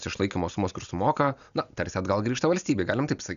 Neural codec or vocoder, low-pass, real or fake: none; 7.2 kHz; real